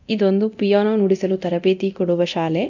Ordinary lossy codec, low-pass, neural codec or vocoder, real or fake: none; 7.2 kHz; codec, 24 kHz, 0.9 kbps, DualCodec; fake